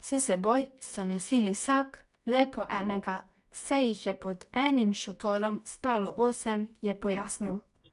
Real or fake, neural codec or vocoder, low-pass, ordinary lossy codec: fake; codec, 24 kHz, 0.9 kbps, WavTokenizer, medium music audio release; 10.8 kHz; none